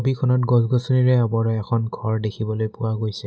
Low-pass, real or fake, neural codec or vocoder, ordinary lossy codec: none; real; none; none